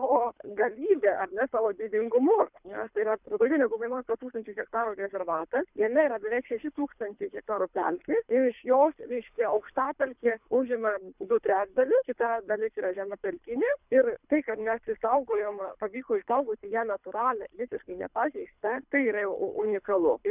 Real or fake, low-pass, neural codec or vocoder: fake; 3.6 kHz; codec, 24 kHz, 3 kbps, HILCodec